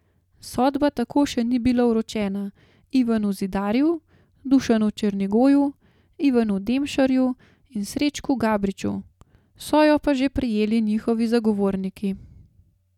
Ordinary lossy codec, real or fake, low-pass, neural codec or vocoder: none; real; 19.8 kHz; none